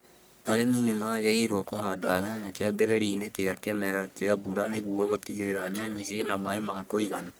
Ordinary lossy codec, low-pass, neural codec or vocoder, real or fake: none; none; codec, 44.1 kHz, 1.7 kbps, Pupu-Codec; fake